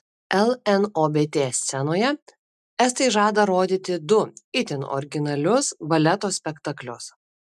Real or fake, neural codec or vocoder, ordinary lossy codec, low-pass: real; none; MP3, 96 kbps; 14.4 kHz